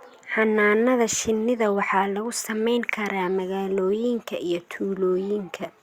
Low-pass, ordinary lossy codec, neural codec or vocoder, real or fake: 19.8 kHz; Opus, 64 kbps; none; real